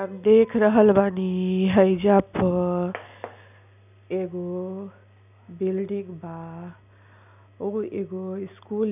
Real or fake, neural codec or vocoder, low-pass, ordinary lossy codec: real; none; 3.6 kHz; none